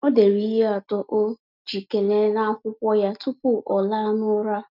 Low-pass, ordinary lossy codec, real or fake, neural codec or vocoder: 5.4 kHz; none; real; none